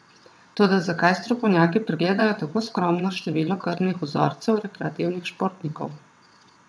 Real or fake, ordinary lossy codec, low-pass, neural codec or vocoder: fake; none; none; vocoder, 22.05 kHz, 80 mel bands, WaveNeXt